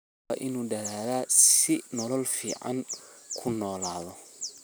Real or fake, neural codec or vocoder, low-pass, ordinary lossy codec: real; none; none; none